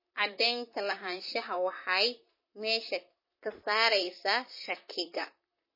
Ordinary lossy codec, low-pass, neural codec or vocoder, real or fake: MP3, 24 kbps; 5.4 kHz; vocoder, 44.1 kHz, 128 mel bands, Pupu-Vocoder; fake